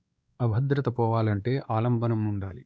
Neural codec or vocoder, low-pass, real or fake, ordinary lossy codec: codec, 16 kHz, 2 kbps, X-Codec, WavLM features, trained on Multilingual LibriSpeech; none; fake; none